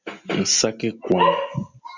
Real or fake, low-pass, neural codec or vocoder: real; 7.2 kHz; none